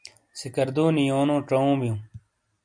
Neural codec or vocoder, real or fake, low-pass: none; real; 9.9 kHz